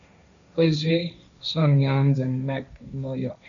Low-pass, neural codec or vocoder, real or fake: 7.2 kHz; codec, 16 kHz, 1.1 kbps, Voila-Tokenizer; fake